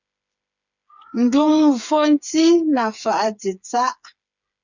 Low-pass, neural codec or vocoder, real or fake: 7.2 kHz; codec, 16 kHz, 4 kbps, FreqCodec, smaller model; fake